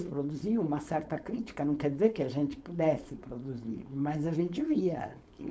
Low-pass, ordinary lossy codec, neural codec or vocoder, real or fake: none; none; codec, 16 kHz, 4.8 kbps, FACodec; fake